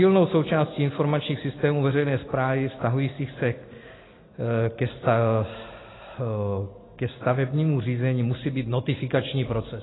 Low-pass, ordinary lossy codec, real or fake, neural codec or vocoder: 7.2 kHz; AAC, 16 kbps; real; none